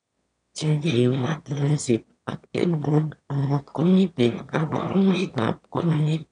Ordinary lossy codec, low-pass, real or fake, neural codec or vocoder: none; 9.9 kHz; fake; autoencoder, 22.05 kHz, a latent of 192 numbers a frame, VITS, trained on one speaker